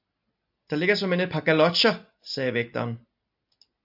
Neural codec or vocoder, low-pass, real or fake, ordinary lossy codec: none; 5.4 kHz; real; AAC, 48 kbps